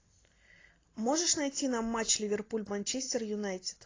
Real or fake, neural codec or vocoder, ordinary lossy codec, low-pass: real; none; AAC, 32 kbps; 7.2 kHz